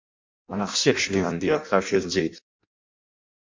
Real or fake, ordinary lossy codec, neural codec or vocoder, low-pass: fake; MP3, 48 kbps; codec, 16 kHz in and 24 kHz out, 0.6 kbps, FireRedTTS-2 codec; 7.2 kHz